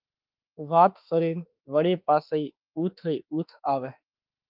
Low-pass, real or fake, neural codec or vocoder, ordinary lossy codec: 5.4 kHz; fake; autoencoder, 48 kHz, 32 numbers a frame, DAC-VAE, trained on Japanese speech; Opus, 32 kbps